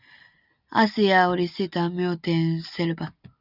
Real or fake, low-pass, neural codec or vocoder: real; 5.4 kHz; none